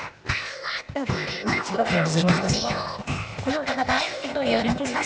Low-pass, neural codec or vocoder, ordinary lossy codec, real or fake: none; codec, 16 kHz, 0.8 kbps, ZipCodec; none; fake